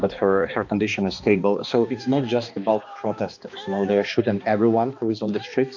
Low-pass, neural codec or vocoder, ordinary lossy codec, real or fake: 7.2 kHz; codec, 16 kHz, 2 kbps, X-Codec, HuBERT features, trained on balanced general audio; AAC, 48 kbps; fake